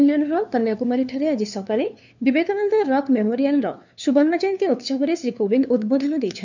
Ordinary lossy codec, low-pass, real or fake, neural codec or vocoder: none; 7.2 kHz; fake; codec, 16 kHz, 2 kbps, FunCodec, trained on LibriTTS, 25 frames a second